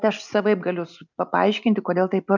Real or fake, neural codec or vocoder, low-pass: real; none; 7.2 kHz